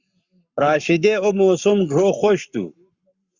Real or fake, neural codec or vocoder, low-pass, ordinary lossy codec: fake; codec, 44.1 kHz, 7.8 kbps, Pupu-Codec; 7.2 kHz; Opus, 64 kbps